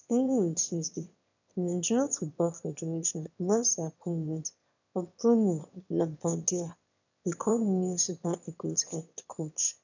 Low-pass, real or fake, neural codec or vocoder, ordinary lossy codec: 7.2 kHz; fake; autoencoder, 22.05 kHz, a latent of 192 numbers a frame, VITS, trained on one speaker; none